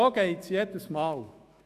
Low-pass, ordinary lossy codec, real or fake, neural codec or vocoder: 14.4 kHz; AAC, 96 kbps; fake; autoencoder, 48 kHz, 128 numbers a frame, DAC-VAE, trained on Japanese speech